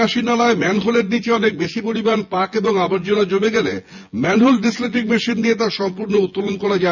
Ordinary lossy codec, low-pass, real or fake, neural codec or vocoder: none; 7.2 kHz; fake; vocoder, 24 kHz, 100 mel bands, Vocos